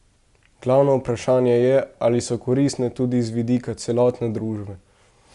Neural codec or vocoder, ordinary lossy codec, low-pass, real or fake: none; Opus, 64 kbps; 10.8 kHz; real